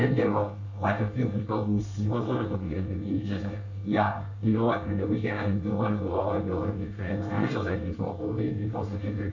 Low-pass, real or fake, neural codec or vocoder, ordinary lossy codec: 7.2 kHz; fake; codec, 24 kHz, 1 kbps, SNAC; none